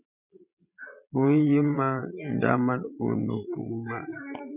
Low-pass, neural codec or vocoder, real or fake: 3.6 kHz; vocoder, 22.05 kHz, 80 mel bands, Vocos; fake